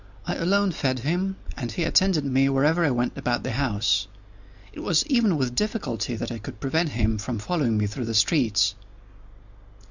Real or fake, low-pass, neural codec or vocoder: real; 7.2 kHz; none